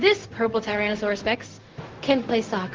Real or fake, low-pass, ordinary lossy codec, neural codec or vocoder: fake; 7.2 kHz; Opus, 16 kbps; codec, 16 kHz, 0.4 kbps, LongCat-Audio-Codec